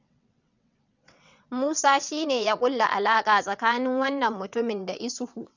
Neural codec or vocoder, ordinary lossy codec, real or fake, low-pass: vocoder, 22.05 kHz, 80 mel bands, WaveNeXt; none; fake; 7.2 kHz